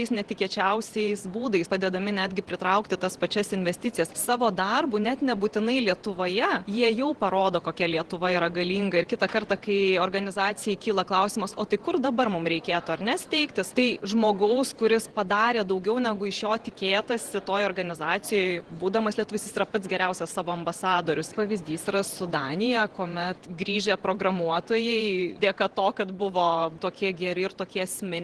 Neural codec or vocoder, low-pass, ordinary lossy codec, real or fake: vocoder, 48 kHz, 128 mel bands, Vocos; 10.8 kHz; Opus, 16 kbps; fake